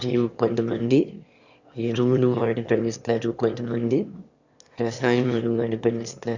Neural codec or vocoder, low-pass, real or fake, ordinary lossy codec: autoencoder, 22.05 kHz, a latent of 192 numbers a frame, VITS, trained on one speaker; 7.2 kHz; fake; Opus, 64 kbps